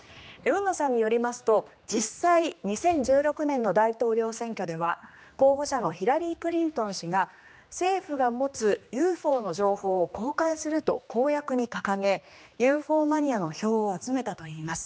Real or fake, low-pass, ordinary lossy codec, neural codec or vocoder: fake; none; none; codec, 16 kHz, 2 kbps, X-Codec, HuBERT features, trained on general audio